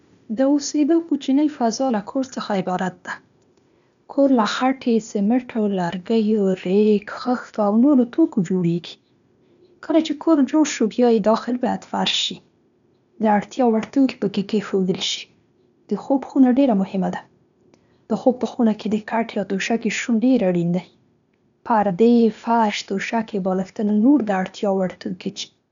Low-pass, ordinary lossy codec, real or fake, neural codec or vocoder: 7.2 kHz; none; fake; codec, 16 kHz, 0.8 kbps, ZipCodec